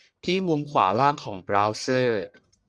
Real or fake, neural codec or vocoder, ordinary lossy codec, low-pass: fake; codec, 44.1 kHz, 1.7 kbps, Pupu-Codec; Opus, 64 kbps; 9.9 kHz